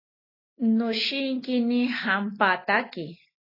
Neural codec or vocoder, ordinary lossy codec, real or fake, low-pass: none; AAC, 24 kbps; real; 5.4 kHz